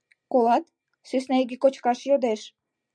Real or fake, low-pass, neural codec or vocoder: real; 9.9 kHz; none